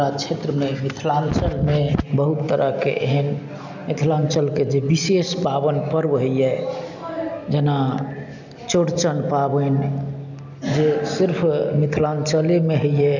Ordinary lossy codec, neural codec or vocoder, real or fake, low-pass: none; none; real; 7.2 kHz